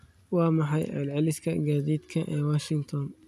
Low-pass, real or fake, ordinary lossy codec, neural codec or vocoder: 14.4 kHz; real; none; none